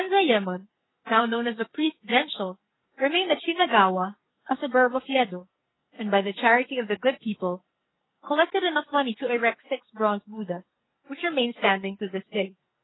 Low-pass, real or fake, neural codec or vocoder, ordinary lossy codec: 7.2 kHz; fake; codec, 44.1 kHz, 2.6 kbps, SNAC; AAC, 16 kbps